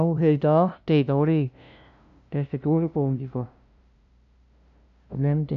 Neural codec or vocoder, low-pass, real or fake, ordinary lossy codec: codec, 16 kHz, 0.5 kbps, FunCodec, trained on LibriTTS, 25 frames a second; 7.2 kHz; fake; none